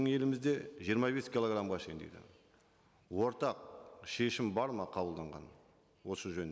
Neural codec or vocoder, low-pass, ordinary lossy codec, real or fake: none; none; none; real